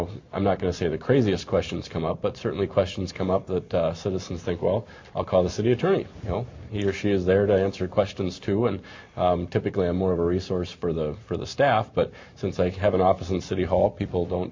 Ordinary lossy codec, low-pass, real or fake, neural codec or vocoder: MP3, 48 kbps; 7.2 kHz; real; none